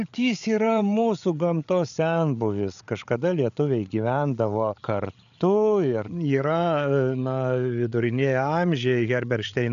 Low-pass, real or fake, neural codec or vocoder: 7.2 kHz; fake; codec, 16 kHz, 8 kbps, FreqCodec, larger model